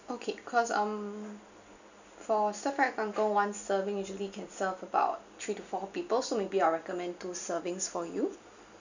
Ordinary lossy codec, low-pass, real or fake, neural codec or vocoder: none; 7.2 kHz; real; none